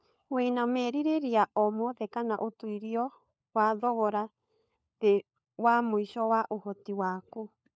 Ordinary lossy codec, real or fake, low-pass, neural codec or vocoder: none; fake; none; codec, 16 kHz, 4 kbps, FunCodec, trained on LibriTTS, 50 frames a second